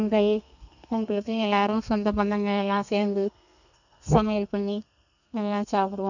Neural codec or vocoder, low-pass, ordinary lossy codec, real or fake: codec, 32 kHz, 1.9 kbps, SNAC; 7.2 kHz; none; fake